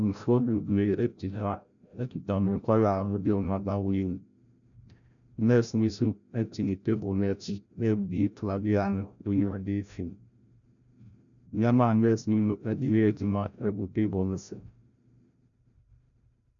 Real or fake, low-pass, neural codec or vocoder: fake; 7.2 kHz; codec, 16 kHz, 0.5 kbps, FreqCodec, larger model